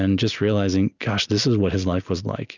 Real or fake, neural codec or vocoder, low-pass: real; none; 7.2 kHz